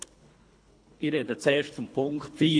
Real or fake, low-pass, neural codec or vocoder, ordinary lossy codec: fake; 9.9 kHz; codec, 24 kHz, 3 kbps, HILCodec; AAC, 48 kbps